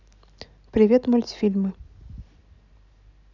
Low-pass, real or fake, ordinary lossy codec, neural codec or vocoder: 7.2 kHz; real; none; none